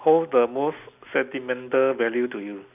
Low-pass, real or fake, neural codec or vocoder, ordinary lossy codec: 3.6 kHz; real; none; none